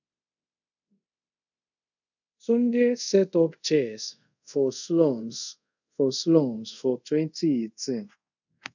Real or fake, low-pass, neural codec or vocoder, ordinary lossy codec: fake; 7.2 kHz; codec, 24 kHz, 0.5 kbps, DualCodec; none